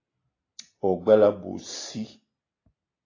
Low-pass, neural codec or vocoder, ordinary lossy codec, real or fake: 7.2 kHz; none; AAC, 32 kbps; real